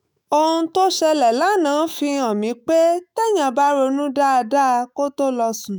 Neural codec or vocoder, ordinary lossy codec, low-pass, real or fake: autoencoder, 48 kHz, 128 numbers a frame, DAC-VAE, trained on Japanese speech; none; none; fake